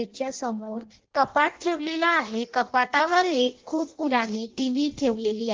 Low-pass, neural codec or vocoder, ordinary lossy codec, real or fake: 7.2 kHz; codec, 16 kHz in and 24 kHz out, 0.6 kbps, FireRedTTS-2 codec; Opus, 16 kbps; fake